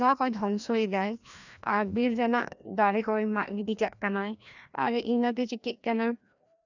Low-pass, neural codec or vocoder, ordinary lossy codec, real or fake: 7.2 kHz; codec, 16 kHz, 1 kbps, FreqCodec, larger model; none; fake